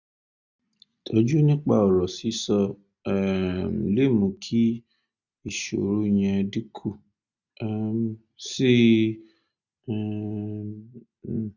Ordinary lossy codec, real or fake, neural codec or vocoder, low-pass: none; real; none; 7.2 kHz